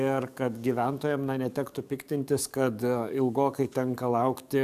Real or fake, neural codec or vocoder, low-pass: fake; codec, 44.1 kHz, 7.8 kbps, DAC; 14.4 kHz